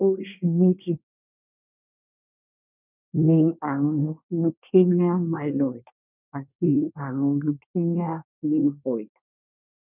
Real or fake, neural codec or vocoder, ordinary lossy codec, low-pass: fake; codec, 24 kHz, 1 kbps, SNAC; none; 3.6 kHz